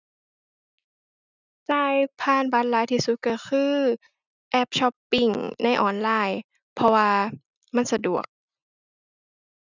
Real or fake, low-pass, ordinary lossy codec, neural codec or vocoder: real; 7.2 kHz; none; none